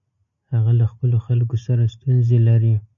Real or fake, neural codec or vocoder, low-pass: real; none; 7.2 kHz